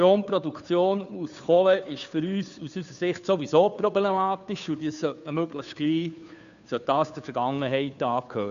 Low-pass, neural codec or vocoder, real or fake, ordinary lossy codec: 7.2 kHz; codec, 16 kHz, 2 kbps, FunCodec, trained on Chinese and English, 25 frames a second; fake; none